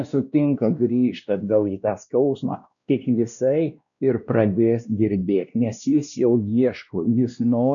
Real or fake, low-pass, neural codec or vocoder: fake; 7.2 kHz; codec, 16 kHz, 1 kbps, X-Codec, WavLM features, trained on Multilingual LibriSpeech